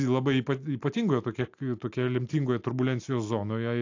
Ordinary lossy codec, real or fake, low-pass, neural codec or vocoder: AAC, 48 kbps; real; 7.2 kHz; none